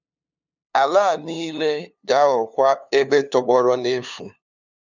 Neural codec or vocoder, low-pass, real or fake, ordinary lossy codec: codec, 16 kHz, 2 kbps, FunCodec, trained on LibriTTS, 25 frames a second; 7.2 kHz; fake; none